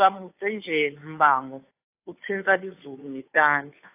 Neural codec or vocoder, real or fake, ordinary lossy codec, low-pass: codec, 16 kHz, 2 kbps, FunCodec, trained on Chinese and English, 25 frames a second; fake; AAC, 24 kbps; 3.6 kHz